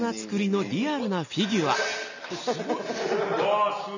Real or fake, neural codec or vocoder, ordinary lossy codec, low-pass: real; none; none; 7.2 kHz